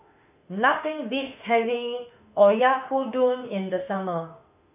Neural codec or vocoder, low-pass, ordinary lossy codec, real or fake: autoencoder, 48 kHz, 32 numbers a frame, DAC-VAE, trained on Japanese speech; 3.6 kHz; AAC, 32 kbps; fake